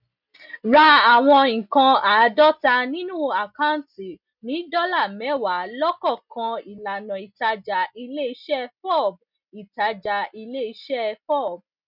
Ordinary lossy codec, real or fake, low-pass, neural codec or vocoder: none; real; 5.4 kHz; none